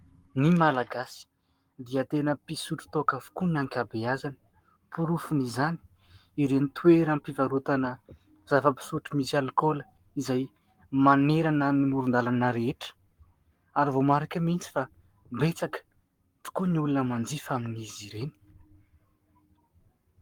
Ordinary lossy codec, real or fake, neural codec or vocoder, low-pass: Opus, 32 kbps; fake; codec, 44.1 kHz, 7.8 kbps, Pupu-Codec; 19.8 kHz